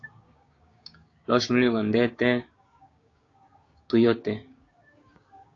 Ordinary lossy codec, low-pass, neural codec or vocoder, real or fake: AAC, 32 kbps; 7.2 kHz; codec, 16 kHz, 6 kbps, DAC; fake